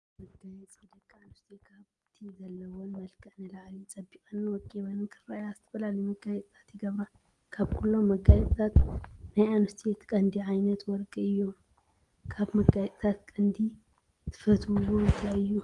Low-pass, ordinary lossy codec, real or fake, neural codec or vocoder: 10.8 kHz; Opus, 24 kbps; real; none